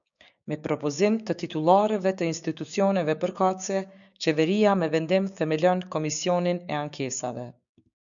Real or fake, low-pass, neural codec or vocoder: fake; 7.2 kHz; codec, 16 kHz, 6 kbps, DAC